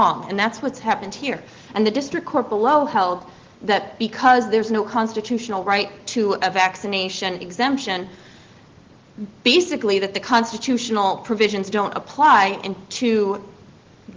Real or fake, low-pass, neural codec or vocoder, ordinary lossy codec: real; 7.2 kHz; none; Opus, 16 kbps